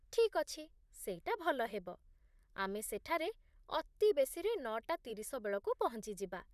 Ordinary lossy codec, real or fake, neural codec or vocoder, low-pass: none; fake; vocoder, 44.1 kHz, 128 mel bands, Pupu-Vocoder; 14.4 kHz